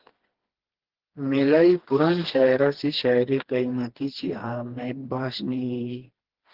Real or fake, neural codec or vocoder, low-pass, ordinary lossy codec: fake; codec, 16 kHz, 2 kbps, FreqCodec, smaller model; 5.4 kHz; Opus, 16 kbps